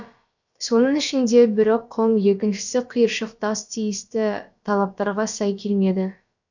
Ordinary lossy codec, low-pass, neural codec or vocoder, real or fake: none; 7.2 kHz; codec, 16 kHz, about 1 kbps, DyCAST, with the encoder's durations; fake